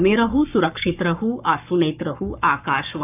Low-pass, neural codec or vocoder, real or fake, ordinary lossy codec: 3.6 kHz; codec, 44.1 kHz, 7.8 kbps, Pupu-Codec; fake; none